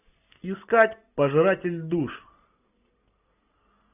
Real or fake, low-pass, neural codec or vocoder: real; 3.6 kHz; none